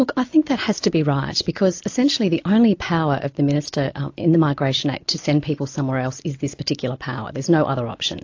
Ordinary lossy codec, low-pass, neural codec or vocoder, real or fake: AAC, 48 kbps; 7.2 kHz; none; real